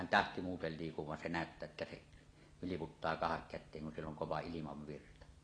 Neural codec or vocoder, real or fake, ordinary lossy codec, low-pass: none; real; AAC, 32 kbps; 9.9 kHz